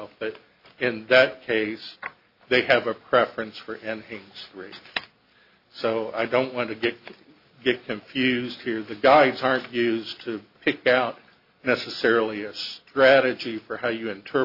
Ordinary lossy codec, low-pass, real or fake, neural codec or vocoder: AAC, 48 kbps; 5.4 kHz; real; none